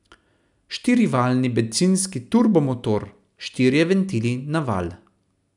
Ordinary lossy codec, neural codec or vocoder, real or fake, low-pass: none; none; real; 10.8 kHz